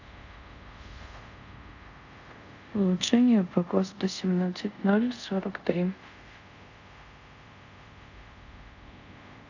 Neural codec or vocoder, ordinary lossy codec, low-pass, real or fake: codec, 24 kHz, 0.5 kbps, DualCodec; none; 7.2 kHz; fake